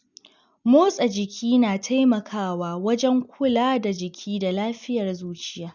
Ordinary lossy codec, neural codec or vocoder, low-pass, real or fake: none; none; 7.2 kHz; real